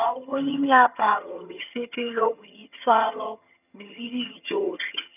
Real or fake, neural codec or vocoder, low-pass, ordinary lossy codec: fake; vocoder, 22.05 kHz, 80 mel bands, HiFi-GAN; 3.6 kHz; none